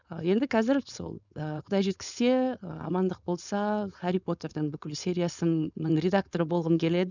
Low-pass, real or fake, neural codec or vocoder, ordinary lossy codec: 7.2 kHz; fake; codec, 16 kHz, 4.8 kbps, FACodec; none